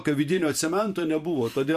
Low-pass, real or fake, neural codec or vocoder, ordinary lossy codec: 14.4 kHz; fake; vocoder, 44.1 kHz, 128 mel bands every 512 samples, BigVGAN v2; MP3, 64 kbps